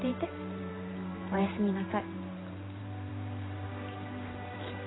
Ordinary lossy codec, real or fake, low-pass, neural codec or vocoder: AAC, 16 kbps; fake; 7.2 kHz; vocoder, 44.1 kHz, 128 mel bands every 512 samples, BigVGAN v2